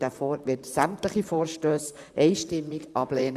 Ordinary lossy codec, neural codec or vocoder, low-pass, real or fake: MP3, 96 kbps; vocoder, 44.1 kHz, 128 mel bands, Pupu-Vocoder; 14.4 kHz; fake